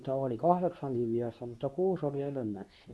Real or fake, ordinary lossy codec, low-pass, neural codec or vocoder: fake; none; none; codec, 24 kHz, 0.9 kbps, WavTokenizer, medium speech release version 2